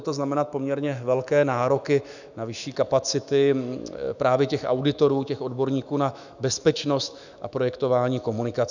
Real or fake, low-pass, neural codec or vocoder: fake; 7.2 kHz; autoencoder, 48 kHz, 128 numbers a frame, DAC-VAE, trained on Japanese speech